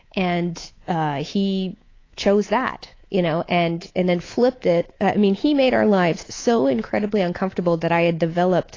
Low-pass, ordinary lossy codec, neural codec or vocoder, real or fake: 7.2 kHz; AAC, 32 kbps; codec, 24 kHz, 3.1 kbps, DualCodec; fake